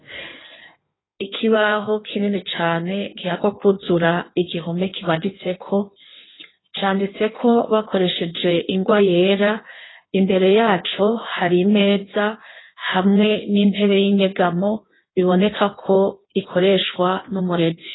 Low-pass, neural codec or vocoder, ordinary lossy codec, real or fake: 7.2 kHz; codec, 16 kHz in and 24 kHz out, 1.1 kbps, FireRedTTS-2 codec; AAC, 16 kbps; fake